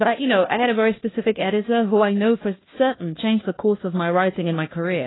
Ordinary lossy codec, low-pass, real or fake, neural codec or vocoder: AAC, 16 kbps; 7.2 kHz; fake; codec, 16 kHz, 1 kbps, FunCodec, trained on LibriTTS, 50 frames a second